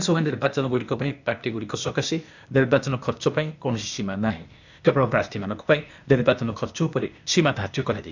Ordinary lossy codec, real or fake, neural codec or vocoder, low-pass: none; fake; codec, 16 kHz, 0.8 kbps, ZipCodec; 7.2 kHz